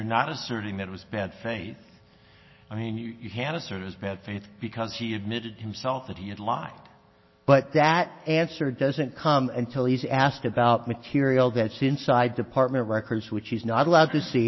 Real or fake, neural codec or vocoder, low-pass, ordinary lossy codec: real; none; 7.2 kHz; MP3, 24 kbps